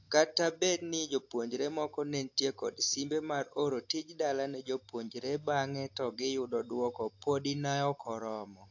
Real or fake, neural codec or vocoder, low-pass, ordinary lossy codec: real; none; 7.2 kHz; AAC, 48 kbps